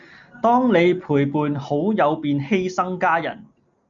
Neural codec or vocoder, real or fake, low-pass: none; real; 7.2 kHz